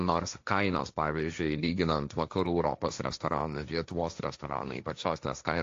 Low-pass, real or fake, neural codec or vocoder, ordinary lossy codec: 7.2 kHz; fake; codec, 16 kHz, 1.1 kbps, Voila-Tokenizer; AAC, 48 kbps